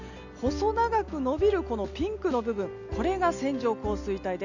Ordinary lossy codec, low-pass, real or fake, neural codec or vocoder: none; 7.2 kHz; real; none